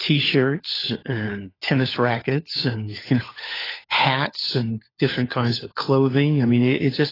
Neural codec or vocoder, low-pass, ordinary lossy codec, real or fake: codec, 16 kHz, 4 kbps, FunCodec, trained on LibriTTS, 50 frames a second; 5.4 kHz; AAC, 24 kbps; fake